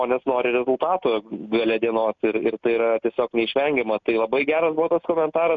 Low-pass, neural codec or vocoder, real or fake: 7.2 kHz; none; real